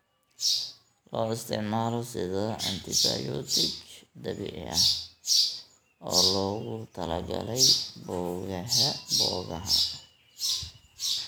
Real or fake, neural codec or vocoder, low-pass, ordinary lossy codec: real; none; none; none